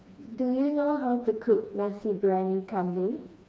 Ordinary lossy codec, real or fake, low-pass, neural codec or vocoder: none; fake; none; codec, 16 kHz, 2 kbps, FreqCodec, smaller model